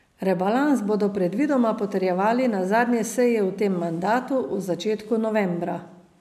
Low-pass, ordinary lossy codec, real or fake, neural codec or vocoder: 14.4 kHz; none; real; none